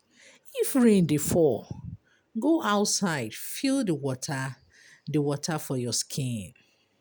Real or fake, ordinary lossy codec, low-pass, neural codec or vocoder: real; none; none; none